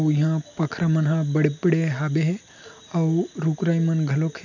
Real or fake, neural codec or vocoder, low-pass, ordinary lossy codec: real; none; 7.2 kHz; none